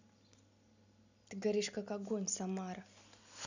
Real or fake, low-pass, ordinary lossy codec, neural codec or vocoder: real; 7.2 kHz; none; none